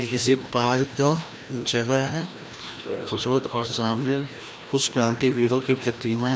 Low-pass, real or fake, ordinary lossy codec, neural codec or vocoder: none; fake; none; codec, 16 kHz, 1 kbps, FreqCodec, larger model